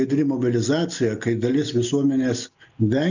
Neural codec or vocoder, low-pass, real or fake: none; 7.2 kHz; real